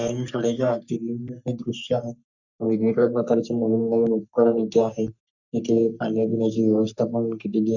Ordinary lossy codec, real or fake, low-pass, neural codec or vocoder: none; fake; 7.2 kHz; codec, 44.1 kHz, 3.4 kbps, Pupu-Codec